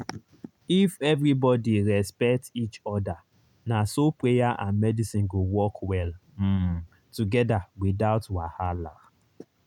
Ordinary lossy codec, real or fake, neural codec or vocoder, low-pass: none; real; none; 19.8 kHz